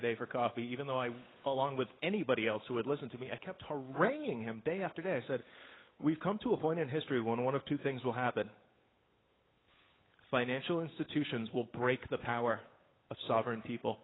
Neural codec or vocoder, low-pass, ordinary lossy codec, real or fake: codec, 16 kHz, 8 kbps, FunCodec, trained on Chinese and English, 25 frames a second; 7.2 kHz; AAC, 16 kbps; fake